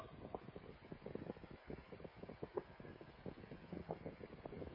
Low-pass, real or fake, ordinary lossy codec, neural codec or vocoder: 5.4 kHz; fake; MP3, 24 kbps; codec, 16 kHz, 16 kbps, FreqCodec, smaller model